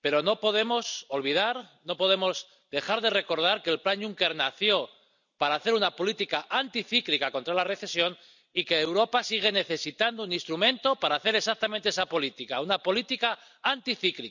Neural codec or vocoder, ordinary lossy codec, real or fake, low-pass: none; none; real; 7.2 kHz